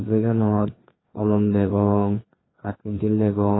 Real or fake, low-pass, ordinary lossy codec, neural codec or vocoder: fake; 7.2 kHz; AAC, 16 kbps; codec, 16 kHz, 2 kbps, FreqCodec, larger model